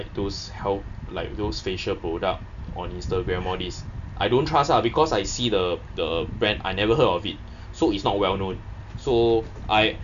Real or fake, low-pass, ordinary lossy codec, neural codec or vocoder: real; 7.2 kHz; none; none